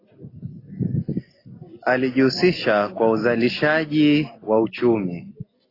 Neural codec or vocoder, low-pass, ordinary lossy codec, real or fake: none; 5.4 kHz; AAC, 24 kbps; real